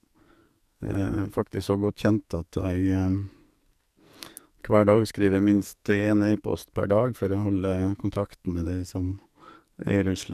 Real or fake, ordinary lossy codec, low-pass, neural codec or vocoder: fake; none; 14.4 kHz; codec, 44.1 kHz, 2.6 kbps, SNAC